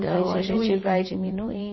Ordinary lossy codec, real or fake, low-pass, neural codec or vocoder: MP3, 24 kbps; fake; 7.2 kHz; vocoder, 22.05 kHz, 80 mel bands, Vocos